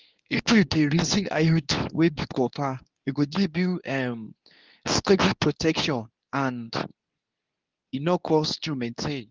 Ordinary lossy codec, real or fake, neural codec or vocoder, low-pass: Opus, 32 kbps; fake; codec, 24 kHz, 0.9 kbps, WavTokenizer, medium speech release version 2; 7.2 kHz